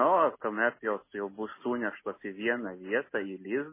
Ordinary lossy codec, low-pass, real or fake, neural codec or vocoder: MP3, 16 kbps; 3.6 kHz; real; none